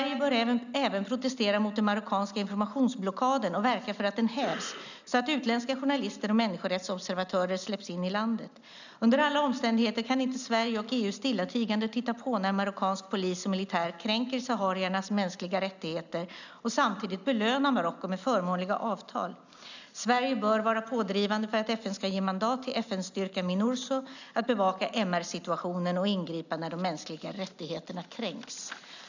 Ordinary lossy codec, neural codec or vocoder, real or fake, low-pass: none; none; real; 7.2 kHz